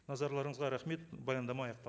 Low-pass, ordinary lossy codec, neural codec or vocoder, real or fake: none; none; none; real